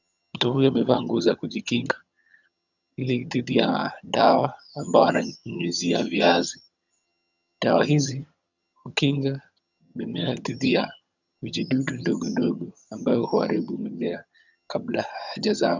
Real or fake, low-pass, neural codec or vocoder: fake; 7.2 kHz; vocoder, 22.05 kHz, 80 mel bands, HiFi-GAN